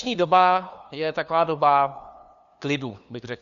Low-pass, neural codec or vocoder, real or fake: 7.2 kHz; codec, 16 kHz, 2 kbps, FunCodec, trained on LibriTTS, 25 frames a second; fake